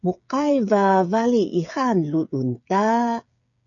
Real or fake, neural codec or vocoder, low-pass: fake; codec, 16 kHz, 8 kbps, FreqCodec, smaller model; 7.2 kHz